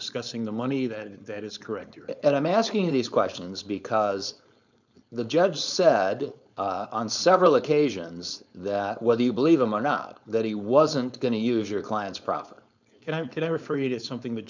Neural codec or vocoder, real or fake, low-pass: codec, 16 kHz, 4.8 kbps, FACodec; fake; 7.2 kHz